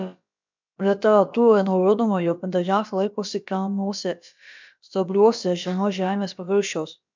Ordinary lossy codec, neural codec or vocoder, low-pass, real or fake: MP3, 64 kbps; codec, 16 kHz, about 1 kbps, DyCAST, with the encoder's durations; 7.2 kHz; fake